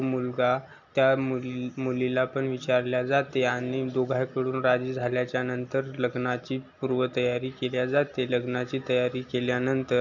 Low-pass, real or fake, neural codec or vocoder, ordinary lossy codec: 7.2 kHz; real; none; none